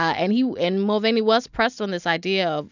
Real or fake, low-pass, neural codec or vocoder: real; 7.2 kHz; none